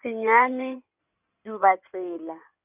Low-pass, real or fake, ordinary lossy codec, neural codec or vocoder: 3.6 kHz; fake; none; codec, 16 kHz in and 24 kHz out, 2.2 kbps, FireRedTTS-2 codec